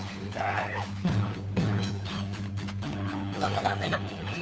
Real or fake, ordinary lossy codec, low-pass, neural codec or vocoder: fake; none; none; codec, 16 kHz, 4 kbps, FunCodec, trained on LibriTTS, 50 frames a second